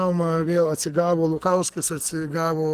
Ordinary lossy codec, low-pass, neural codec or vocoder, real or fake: Opus, 24 kbps; 14.4 kHz; codec, 44.1 kHz, 2.6 kbps, SNAC; fake